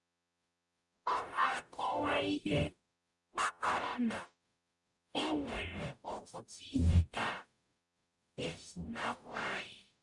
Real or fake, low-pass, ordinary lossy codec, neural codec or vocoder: fake; 10.8 kHz; none; codec, 44.1 kHz, 0.9 kbps, DAC